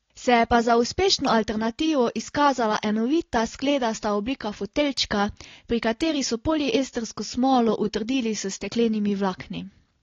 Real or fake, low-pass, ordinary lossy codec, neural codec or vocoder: real; 7.2 kHz; AAC, 32 kbps; none